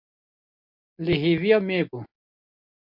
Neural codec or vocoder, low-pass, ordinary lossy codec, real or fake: none; 5.4 kHz; MP3, 48 kbps; real